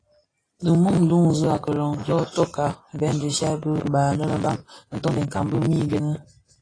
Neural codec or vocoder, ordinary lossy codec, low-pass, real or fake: none; AAC, 32 kbps; 9.9 kHz; real